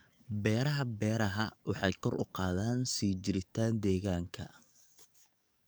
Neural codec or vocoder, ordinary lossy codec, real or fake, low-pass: codec, 44.1 kHz, 7.8 kbps, DAC; none; fake; none